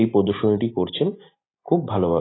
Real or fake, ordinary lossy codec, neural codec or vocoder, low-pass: real; AAC, 16 kbps; none; 7.2 kHz